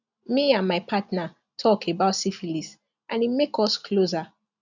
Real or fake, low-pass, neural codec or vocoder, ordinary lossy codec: real; 7.2 kHz; none; none